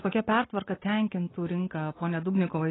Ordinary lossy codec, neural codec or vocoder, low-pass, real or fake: AAC, 16 kbps; none; 7.2 kHz; real